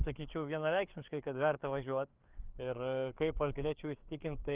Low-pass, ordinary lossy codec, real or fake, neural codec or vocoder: 3.6 kHz; Opus, 32 kbps; fake; codec, 16 kHz, 4 kbps, FreqCodec, larger model